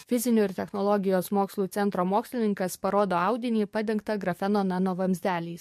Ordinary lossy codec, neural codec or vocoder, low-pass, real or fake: MP3, 64 kbps; codec, 44.1 kHz, 7.8 kbps, DAC; 14.4 kHz; fake